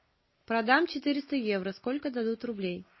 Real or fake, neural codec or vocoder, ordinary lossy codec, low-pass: real; none; MP3, 24 kbps; 7.2 kHz